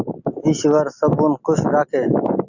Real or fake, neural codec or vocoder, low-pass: real; none; 7.2 kHz